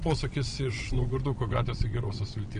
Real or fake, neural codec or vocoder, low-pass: fake; vocoder, 22.05 kHz, 80 mel bands, WaveNeXt; 9.9 kHz